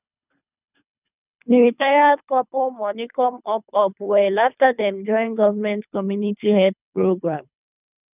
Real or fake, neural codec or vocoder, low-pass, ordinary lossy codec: fake; codec, 24 kHz, 3 kbps, HILCodec; 3.6 kHz; none